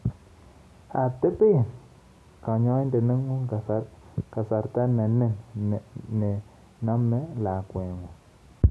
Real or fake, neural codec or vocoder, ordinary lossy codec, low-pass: real; none; none; none